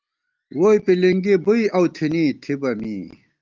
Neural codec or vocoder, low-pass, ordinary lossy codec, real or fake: none; 7.2 kHz; Opus, 24 kbps; real